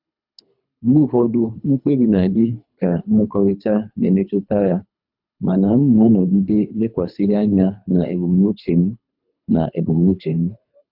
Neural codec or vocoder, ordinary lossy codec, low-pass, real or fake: codec, 24 kHz, 3 kbps, HILCodec; none; 5.4 kHz; fake